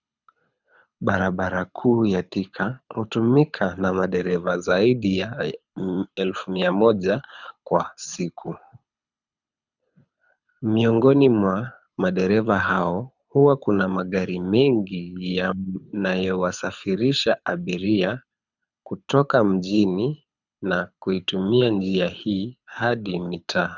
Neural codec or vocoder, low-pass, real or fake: codec, 24 kHz, 6 kbps, HILCodec; 7.2 kHz; fake